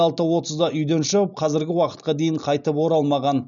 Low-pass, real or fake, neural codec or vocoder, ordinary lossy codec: 7.2 kHz; real; none; none